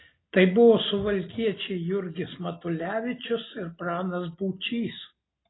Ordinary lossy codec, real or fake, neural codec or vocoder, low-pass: AAC, 16 kbps; real; none; 7.2 kHz